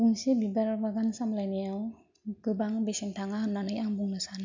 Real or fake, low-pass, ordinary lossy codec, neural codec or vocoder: real; 7.2 kHz; MP3, 64 kbps; none